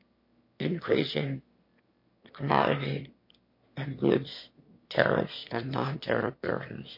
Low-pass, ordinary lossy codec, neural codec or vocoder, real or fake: 5.4 kHz; MP3, 32 kbps; autoencoder, 22.05 kHz, a latent of 192 numbers a frame, VITS, trained on one speaker; fake